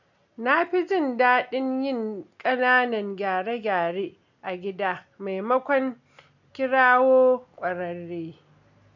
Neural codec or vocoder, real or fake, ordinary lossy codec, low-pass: none; real; none; 7.2 kHz